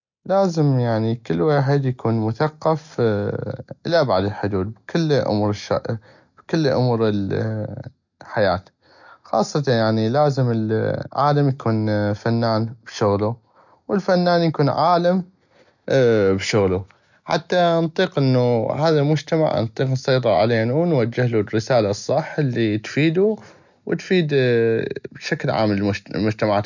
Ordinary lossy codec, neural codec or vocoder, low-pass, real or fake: none; none; 7.2 kHz; real